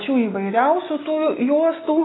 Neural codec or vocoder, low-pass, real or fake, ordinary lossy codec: none; 7.2 kHz; real; AAC, 16 kbps